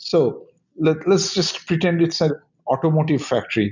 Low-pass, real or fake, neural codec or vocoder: 7.2 kHz; real; none